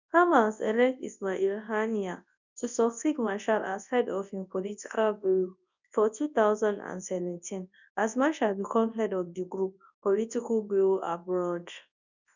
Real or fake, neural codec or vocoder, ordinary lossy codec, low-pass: fake; codec, 24 kHz, 0.9 kbps, WavTokenizer, large speech release; none; 7.2 kHz